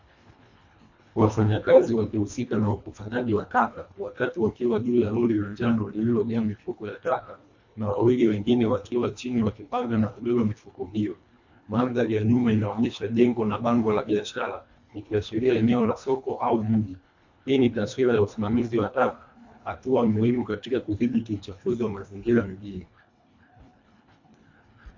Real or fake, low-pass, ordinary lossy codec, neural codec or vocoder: fake; 7.2 kHz; MP3, 48 kbps; codec, 24 kHz, 1.5 kbps, HILCodec